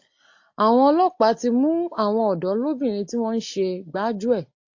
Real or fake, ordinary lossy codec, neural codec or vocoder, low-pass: real; AAC, 48 kbps; none; 7.2 kHz